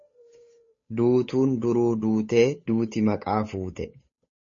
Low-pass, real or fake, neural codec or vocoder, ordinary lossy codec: 7.2 kHz; fake; codec, 16 kHz, 8 kbps, FunCodec, trained on Chinese and English, 25 frames a second; MP3, 32 kbps